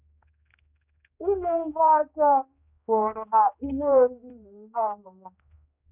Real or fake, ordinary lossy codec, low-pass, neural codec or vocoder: fake; none; 3.6 kHz; codec, 16 kHz, 2 kbps, X-Codec, HuBERT features, trained on general audio